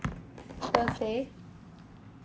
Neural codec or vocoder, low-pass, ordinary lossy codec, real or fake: none; none; none; real